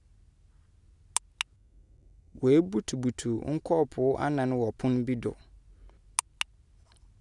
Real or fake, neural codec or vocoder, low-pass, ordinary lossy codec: real; none; 10.8 kHz; none